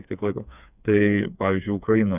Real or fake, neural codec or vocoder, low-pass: fake; codec, 16 kHz, 4 kbps, FreqCodec, smaller model; 3.6 kHz